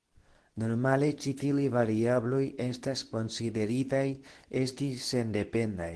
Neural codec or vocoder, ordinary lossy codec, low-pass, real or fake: codec, 24 kHz, 0.9 kbps, WavTokenizer, medium speech release version 2; Opus, 16 kbps; 10.8 kHz; fake